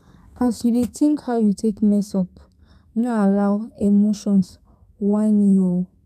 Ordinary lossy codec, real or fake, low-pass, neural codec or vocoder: none; fake; 14.4 kHz; codec, 32 kHz, 1.9 kbps, SNAC